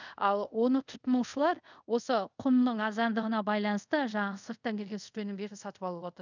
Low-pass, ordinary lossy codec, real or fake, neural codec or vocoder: 7.2 kHz; none; fake; codec, 24 kHz, 0.5 kbps, DualCodec